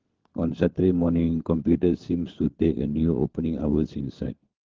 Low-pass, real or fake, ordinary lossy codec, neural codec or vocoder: 7.2 kHz; fake; Opus, 16 kbps; codec, 16 kHz, 4 kbps, FunCodec, trained on LibriTTS, 50 frames a second